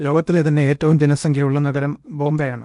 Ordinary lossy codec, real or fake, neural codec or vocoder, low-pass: none; fake; codec, 16 kHz in and 24 kHz out, 0.8 kbps, FocalCodec, streaming, 65536 codes; 10.8 kHz